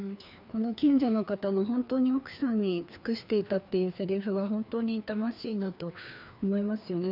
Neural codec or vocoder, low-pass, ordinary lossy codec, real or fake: codec, 16 kHz, 2 kbps, FreqCodec, larger model; 5.4 kHz; none; fake